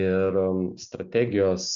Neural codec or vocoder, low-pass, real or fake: none; 7.2 kHz; real